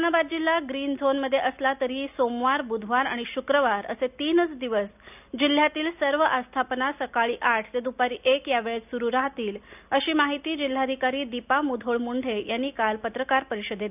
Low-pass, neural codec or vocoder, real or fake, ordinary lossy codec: 3.6 kHz; none; real; none